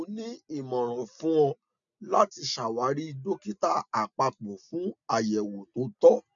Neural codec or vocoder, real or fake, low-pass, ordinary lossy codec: none; real; 7.2 kHz; none